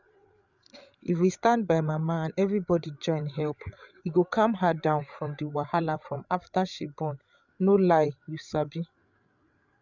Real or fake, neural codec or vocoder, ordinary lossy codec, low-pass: fake; codec, 16 kHz, 8 kbps, FreqCodec, larger model; none; 7.2 kHz